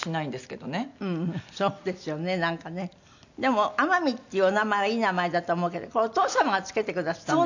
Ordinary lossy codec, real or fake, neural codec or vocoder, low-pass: none; real; none; 7.2 kHz